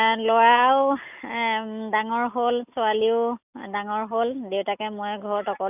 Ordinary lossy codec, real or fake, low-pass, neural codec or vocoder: none; real; 3.6 kHz; none